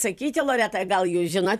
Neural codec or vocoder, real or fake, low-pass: vocoder, 44.1 kHz, 128 mel bands every 256 samples, BigVGAN v2; fake; 14.4 kHz